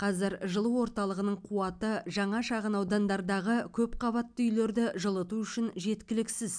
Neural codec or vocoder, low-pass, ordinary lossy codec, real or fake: none; 9.9 kHz; MP3, 96 kbps; real